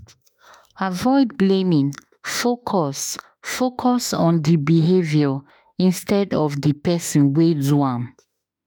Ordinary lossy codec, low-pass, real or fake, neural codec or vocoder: none; none; fake; autoencoder, 48 kHz, 32 numbers a frame, DAC-VAE, trained on Japanese speech